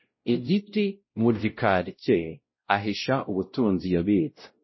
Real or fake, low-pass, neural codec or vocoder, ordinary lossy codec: fake; 7.2 kHz; codec, 16 kHz, 0.5 kbps, X-Codec, WavLM features, trained on Multilingual LibriSpeech; MP3, 24 kbps